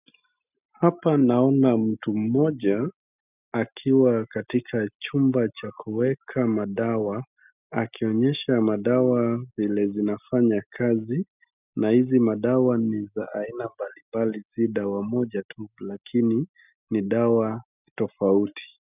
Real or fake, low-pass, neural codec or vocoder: real; 3.6 kHz; none